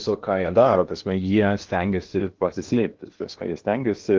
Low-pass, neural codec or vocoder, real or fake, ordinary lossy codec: 7.2 kHz; codec, 16 kHz in and 24 kHz out, 0.8 kbps, FocalCodec, streaming, 65536 codes; fake; Opus, 32 kbps